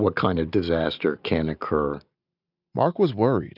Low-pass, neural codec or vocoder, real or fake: 5.4 kHz; none; real